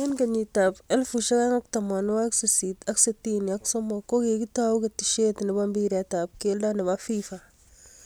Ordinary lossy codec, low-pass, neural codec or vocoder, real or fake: none; none; none; real